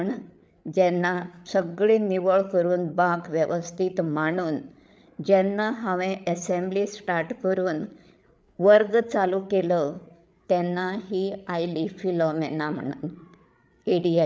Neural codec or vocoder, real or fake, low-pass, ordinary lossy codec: codec, 16 kHz, 8 kbps, FreqCodec, larger model; fake; none; none